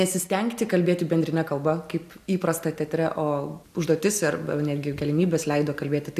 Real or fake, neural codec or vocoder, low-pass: real; none; 14.4 kHz